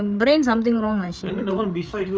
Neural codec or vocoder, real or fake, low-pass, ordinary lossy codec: codec, 16 kHz, 8 kbps, FreqCodec, larger model; fake; none; none